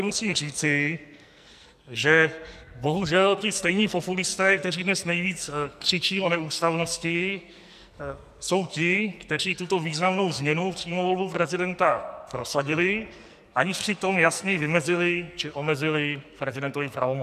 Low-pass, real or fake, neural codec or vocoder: 14.4 kHz; fake; codec, 44.1 kHz, 2.6 kbps, SNAC